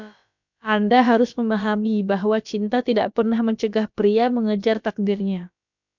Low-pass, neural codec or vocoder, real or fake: 7.2 kHz; codec, 16 kHz, about 1 kbps, DyCAST, with the encoder's durations; fake